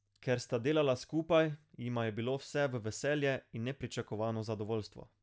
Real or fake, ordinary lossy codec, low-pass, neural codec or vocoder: real; none; none; none